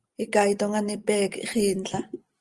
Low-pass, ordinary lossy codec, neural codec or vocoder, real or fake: 10.8 kHz; Opus, 24 kbps; none; real